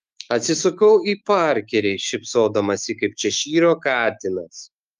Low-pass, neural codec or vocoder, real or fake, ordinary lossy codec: 14.4 kHz; autoencoder, 48 kHz, 128 numbers a frame, DAC-VAE, trained on Japanese speech; fake; Opus, 32 kbps